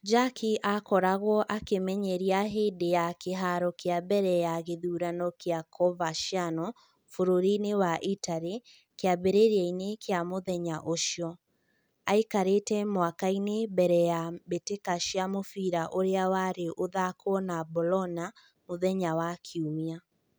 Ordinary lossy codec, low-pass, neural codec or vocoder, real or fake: none; none; none; real